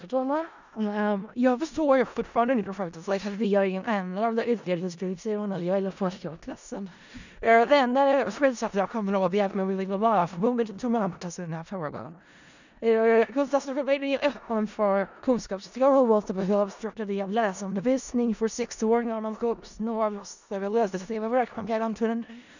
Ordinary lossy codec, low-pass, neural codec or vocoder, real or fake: none; 7.2 kHz; codec, 16 kHz in and 24 kHz out, 0.4 kbps, LongCat-Audio-Codec, four codebook decoder; fake